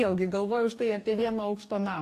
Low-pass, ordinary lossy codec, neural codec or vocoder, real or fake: 14.4 kHz; AAC, 64 kbps; codec, 44.1 kHz, 2.6 kbps, DAC; fake